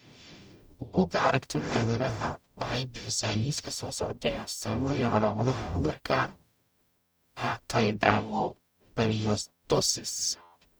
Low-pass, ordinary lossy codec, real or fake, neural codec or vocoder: none; none; fake; codec, 44.1 kHz, 0.9 kbps, DAC